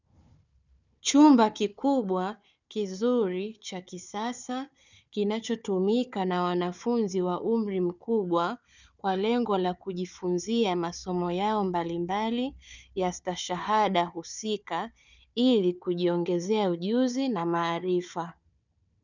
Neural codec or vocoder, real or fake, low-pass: codec, 16 kHz, 4 kbps, FunCodec, trained on Chinese and English, 50 frames a second; fake; 7.2 kHz